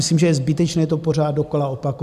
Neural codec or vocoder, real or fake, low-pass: none; real; 14.4 kHz